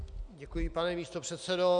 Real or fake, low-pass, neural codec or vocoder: real; 9.9 kHz; none